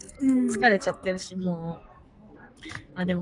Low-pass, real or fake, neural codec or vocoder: 10.8 kHz; fake; codec, 44.1 kHz, 2.6 kbps, SNAC